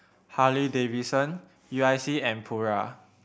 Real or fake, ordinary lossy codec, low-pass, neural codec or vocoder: real; none; none; none